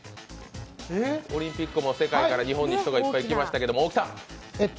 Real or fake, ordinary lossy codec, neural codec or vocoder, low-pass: real; none; none; none